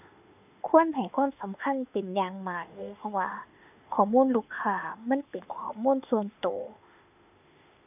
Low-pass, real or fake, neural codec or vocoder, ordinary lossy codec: 3.6 kHz; fake; autoencoder, 48 kHz, 32 numbers a frame, DAC-VAE, trained on Japanese speech; none